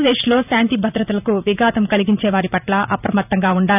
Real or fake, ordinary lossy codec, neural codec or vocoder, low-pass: fake; none; vocoder, 44.1 kHz, 128 mel bands every 512 samples, BigVGAN v2; 3.6 kHz